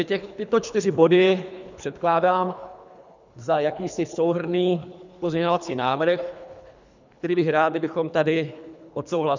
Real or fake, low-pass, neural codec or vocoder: fake; 7.2 kHz; codec, 24 kHz, 3 kbps, HILCodec